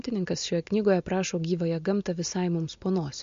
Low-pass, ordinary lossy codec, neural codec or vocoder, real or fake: 7.2 kHz; MP3, 48 kbps; none; real